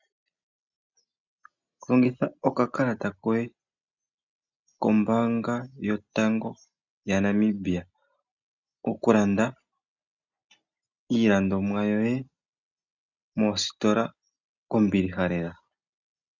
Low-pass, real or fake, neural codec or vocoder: 7.2 kHz; real; none